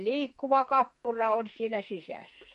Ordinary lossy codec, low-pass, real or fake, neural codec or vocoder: MP3, 48 kbps; 14.4 kHz; fake; codec, 44.1 kHz, 2.6 kbps, SNAC